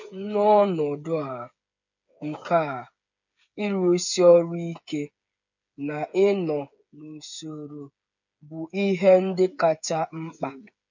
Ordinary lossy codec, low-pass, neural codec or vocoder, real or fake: none; 7.2 kHz; codec, 16 kHz, 8 kbps, FreqCodec, smaller model; fake